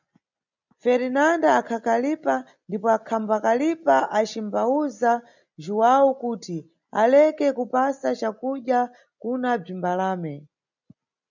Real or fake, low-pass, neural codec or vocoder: real; 7.2 kHz; none